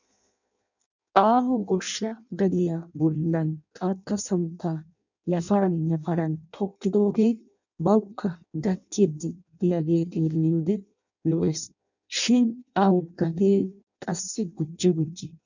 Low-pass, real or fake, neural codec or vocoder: 7.2 kHz; fake; codec, 16 kHz in and 24 kHz out, 0.6 kbps, FireRedTTS-2 codec